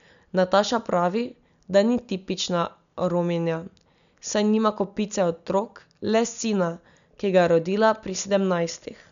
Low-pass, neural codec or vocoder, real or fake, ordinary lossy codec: 7.2 kHz; none; real; none